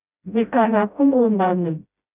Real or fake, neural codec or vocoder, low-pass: fake; codec, 16 kHz, 0.5 kbps, FreqCodec, smaller model; 3.6 kHz